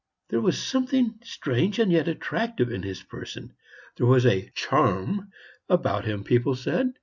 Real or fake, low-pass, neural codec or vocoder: real; 7.2 kHz; none